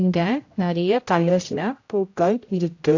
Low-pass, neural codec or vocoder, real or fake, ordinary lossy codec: 7.2 kHz; codec, 16 kHz, 0.5 kbps, X-Codec, HuBERT features, trained on general audio; fake; AAC, 48 kbps